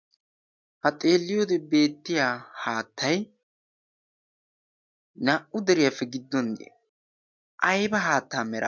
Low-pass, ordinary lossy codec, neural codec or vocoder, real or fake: 7.2 kHz; MP3, 64 kbps; none; real